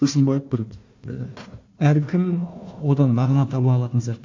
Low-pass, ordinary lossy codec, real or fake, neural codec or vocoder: 7.2 kHz; MP3, 48 kbps; fake; codec, 16 kHz, 1 kbps, FunCodec, trained on Chinese and English, 50 frames a second